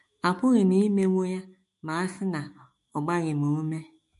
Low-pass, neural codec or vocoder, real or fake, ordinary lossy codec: 14.4 kHz; autoencoder, 48 kHz, 128 numbers a frame, DAC-VAE, trained on Japanese speech; fake; MP3, 48 kbps